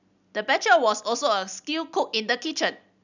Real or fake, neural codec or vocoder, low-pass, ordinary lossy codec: real; none; 7.2 kHz; none